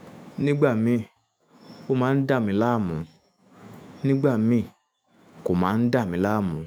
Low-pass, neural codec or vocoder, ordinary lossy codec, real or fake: none; autoencoder, 48 kHz, 128 numbers a frame, DAC-VAE, trained on Japanese speech; none; fake